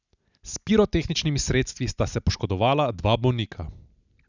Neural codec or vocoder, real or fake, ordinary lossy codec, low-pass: none; real; none; 7.2 kHz